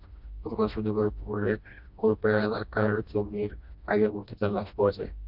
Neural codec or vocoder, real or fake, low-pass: codec, 16 kHz, 1 kbps, FreqCodec, smaller model; fake; 5.4 kHz